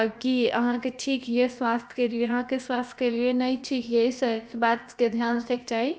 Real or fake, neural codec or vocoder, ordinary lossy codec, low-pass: fake; codec, 16 kHz, about 1 kbps, DyCAST, with the encoder's durations; none; none